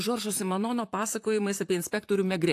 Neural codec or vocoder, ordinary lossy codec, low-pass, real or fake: vocoder, 44.1 kHz, 128 mel bands, Pupu-Vocoder; AAC, 64 kbps; 14.4 kHz; fake